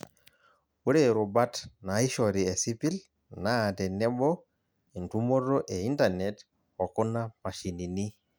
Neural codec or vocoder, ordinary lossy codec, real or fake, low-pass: none; none; real; none